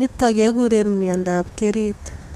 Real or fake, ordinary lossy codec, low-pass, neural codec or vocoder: fake; none; 14.4 kHz; codec, 32 kHz, 1.9 kbps, SNAC